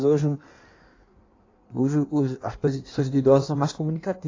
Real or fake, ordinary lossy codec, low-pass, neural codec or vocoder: fake; AAC, 32 kbps; 7.2 kHz; codec, 16 kHz in and 24 kHz out, 1.1 kbps, FireRedTTS-2 codec